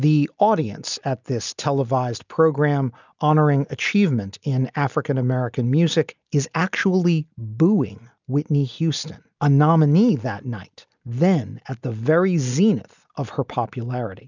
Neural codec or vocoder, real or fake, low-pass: none; real; 7.2 kHz